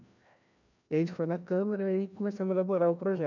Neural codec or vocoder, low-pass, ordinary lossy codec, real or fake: codec, 16 kHz, 1 kbps, FreqCodec, larger model; 7.2 kHz; none; fake